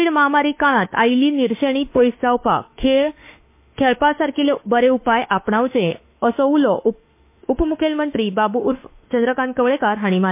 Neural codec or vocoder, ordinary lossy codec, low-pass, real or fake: autoencoder, 48 kHz, 32 numbers a frame, DAC-VAE, trained on Japanese speech; MP3, 24 kbps; 3.6 kHz; fake